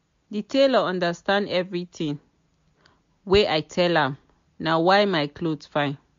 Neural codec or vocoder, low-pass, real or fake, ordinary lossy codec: none; 7.2 kHz; real; MP3, 48 kbps